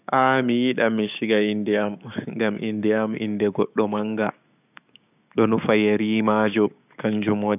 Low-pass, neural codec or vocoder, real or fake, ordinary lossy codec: 3.6 kHz; codec, 16 kHz, 6 kbps, DAC; fake; none